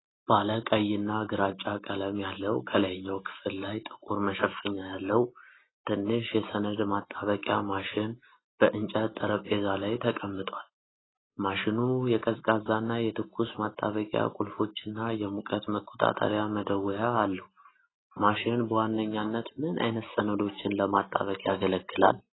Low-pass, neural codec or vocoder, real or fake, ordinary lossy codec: 7.2 kHz; none; real; AAC, 16 kbps